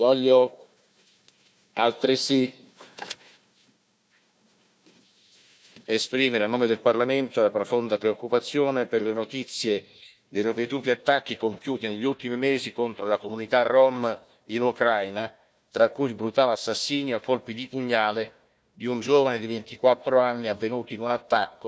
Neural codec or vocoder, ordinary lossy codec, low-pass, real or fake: codec, 16 kHz, 1 kbps, FunCodec, trained on Chinese and English, 50 frames a second; none; none; fake